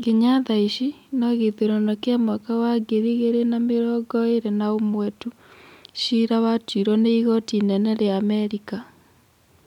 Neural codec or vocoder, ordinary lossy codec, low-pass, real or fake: none; none; 19.8 kHz; real